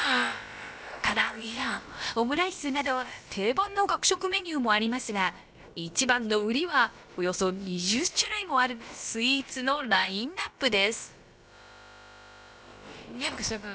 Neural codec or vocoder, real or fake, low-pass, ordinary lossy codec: codec, 16 kHz, about 1 kbps, DyCAST, with the encoder's durations; fake; none; none